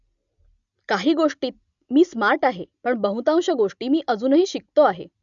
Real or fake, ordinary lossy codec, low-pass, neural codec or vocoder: real; none; 7.2 kHz; none